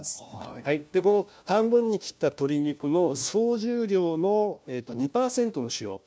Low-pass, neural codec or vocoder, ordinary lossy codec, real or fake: none; codec, 16 kHz, 1 kbps, FunCodec, trained on LibriTTS, 50 frames a second; none; fake